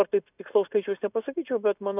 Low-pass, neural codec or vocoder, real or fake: 3.6 kHz; none; real